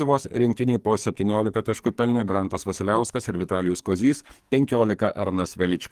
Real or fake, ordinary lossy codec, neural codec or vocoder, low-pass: fake; Opus, 24 kbps; codec, 44.1 kHz, 2.6 kbps, SNAC; 14.4 kHz